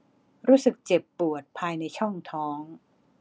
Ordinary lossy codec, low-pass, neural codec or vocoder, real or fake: none; none; none; real